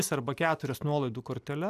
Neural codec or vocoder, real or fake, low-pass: none; real; 14.4 kHz